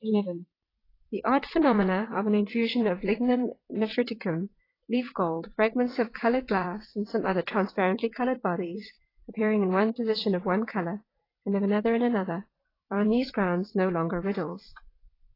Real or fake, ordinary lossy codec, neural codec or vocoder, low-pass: fake; AAC, 24 kbps; vocoder, 22.05 kHz, 80 mel bands, WaveNeXt; 5.4 kHz